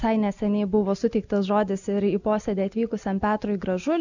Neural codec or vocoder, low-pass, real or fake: none; 7.2 kHz; real